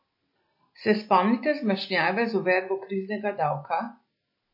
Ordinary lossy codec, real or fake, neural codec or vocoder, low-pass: MP3, 32 kbps; real; none; 5.4 kHz